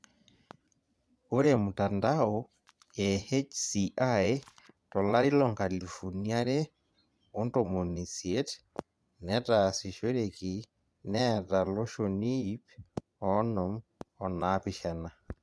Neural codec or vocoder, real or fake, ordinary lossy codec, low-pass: vocoder, 22.05 kHz, 80 mel bands, Vocos; fake; none; none